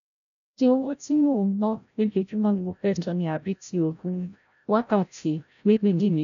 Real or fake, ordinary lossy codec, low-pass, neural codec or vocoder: fake; none; 7.2 kHz; codec, 16 kHz, 0.5 kbps, FreqCodec, larger model